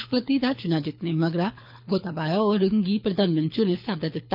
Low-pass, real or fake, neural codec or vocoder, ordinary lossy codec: 5.4 kHz; fake; codec, 24 kHz, 6 kbps, HILCodec; none